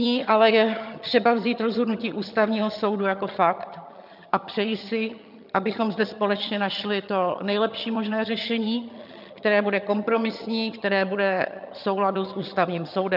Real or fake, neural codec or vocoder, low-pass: fake; vocoder, 22.05 kHz, 80 mel bands, HiFi-GAN; 5.4 kHz